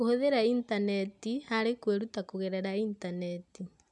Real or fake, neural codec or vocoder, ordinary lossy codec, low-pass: real; none; none; 10.8 kHz